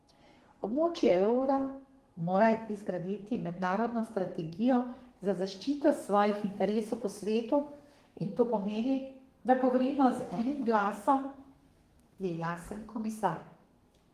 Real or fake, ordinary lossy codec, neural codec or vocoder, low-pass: fake; Opus, 24 kbps; codec, 32 kHz, 1.9 kbps, SNAC; 14.4 kHz